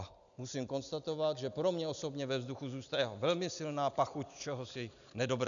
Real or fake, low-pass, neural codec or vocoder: real; 7.2 kHz; none